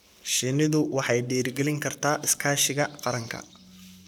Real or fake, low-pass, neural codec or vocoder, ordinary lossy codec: fake; none; codec, 44.1 kHz, 7.8 kbps, Pupu-Codec; none